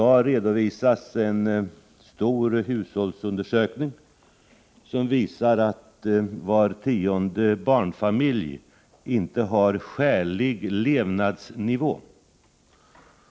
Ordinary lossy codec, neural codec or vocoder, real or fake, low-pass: none; none; real; none